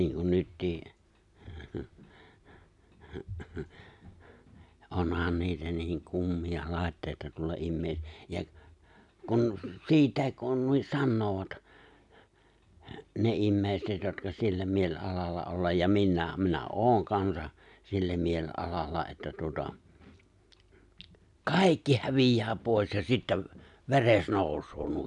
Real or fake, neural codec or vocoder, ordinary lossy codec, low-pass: real; none; none; 10.8 kHz